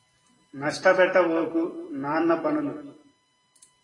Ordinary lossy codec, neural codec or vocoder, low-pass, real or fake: AAC, 32 kbps; vocoder, 44.1 kHz, 128 mel bands every 256 samples, BigVGAN v2; 10.8 kHz; fake